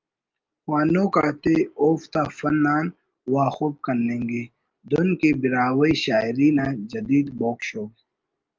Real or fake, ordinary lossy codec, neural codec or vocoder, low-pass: real; Opus, 32 kbps; none; 7.2 kHz